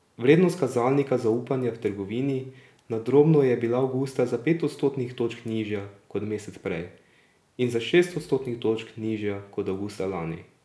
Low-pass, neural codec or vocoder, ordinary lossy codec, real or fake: none; none; none; real